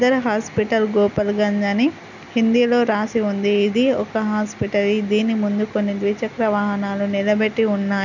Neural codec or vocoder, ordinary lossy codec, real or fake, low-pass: none; none; real; 7.2 kHz